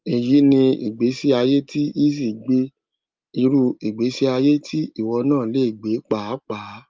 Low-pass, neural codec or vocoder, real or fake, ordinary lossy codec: 7.2 kHz; none; real; Opus, 24 kbps